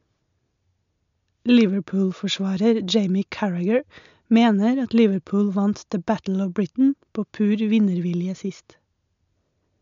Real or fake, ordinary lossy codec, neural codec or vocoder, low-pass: real; MP3, 64 kbps; none; 7.2 kHz